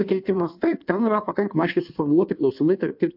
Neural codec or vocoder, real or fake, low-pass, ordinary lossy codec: codec, 16 kHz in and 24 kHz out, 1.1 kbps, FireRedTTS-2 codec; fake; 5.4 kHz; AAC, 48 kbps